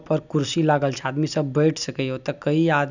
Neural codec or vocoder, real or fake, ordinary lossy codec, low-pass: none; real; none; 7.2 kHz